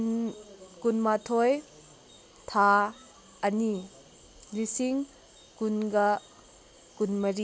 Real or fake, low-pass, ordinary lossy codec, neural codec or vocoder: real; none; none; none